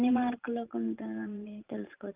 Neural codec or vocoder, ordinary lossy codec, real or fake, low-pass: vocoder, 44.1 kHz, 128 mel bands every 512 samples, BigVGAN v2; Opus, 24 kbps; fake; 3.6 kHz